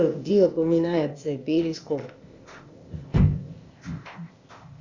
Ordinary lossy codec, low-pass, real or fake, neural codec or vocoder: Opus, 64 kbps; 7.2 kHz; fake; codec, 16 kHz, 0.8 kbps, ZipCodec